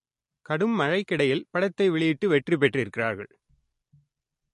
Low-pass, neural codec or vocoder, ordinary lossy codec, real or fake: 14.4 kHz; none; MP3, 48 kbps; real